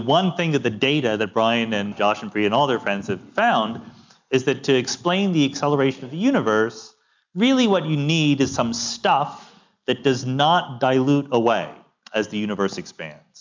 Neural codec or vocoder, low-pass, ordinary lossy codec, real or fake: none; 7.2 kHz; MP3, 64 kbps; real